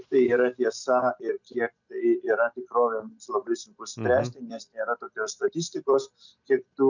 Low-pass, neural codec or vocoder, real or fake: 7.2 kHz; none; real